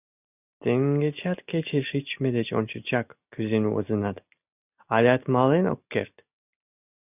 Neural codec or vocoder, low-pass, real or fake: none; 3.6 kHz; real